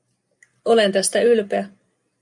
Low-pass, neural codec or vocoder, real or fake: 10.8 kHz; none; real